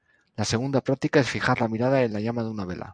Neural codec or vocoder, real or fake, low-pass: none; real; 9.9 kHz